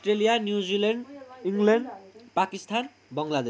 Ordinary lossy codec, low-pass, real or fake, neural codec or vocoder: none; none; real; none